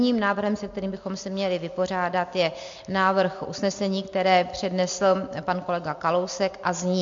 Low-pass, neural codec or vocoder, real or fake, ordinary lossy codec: 7.2 kHz; none; real; MP3, 48 kbps